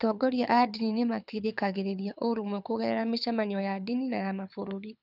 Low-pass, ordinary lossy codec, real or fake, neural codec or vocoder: 5.4 kHz; none; fake; codec, 24 kHz, 6 kbps, HILCodec